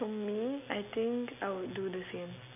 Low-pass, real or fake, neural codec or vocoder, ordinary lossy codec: 3.6 kHz; real; none; none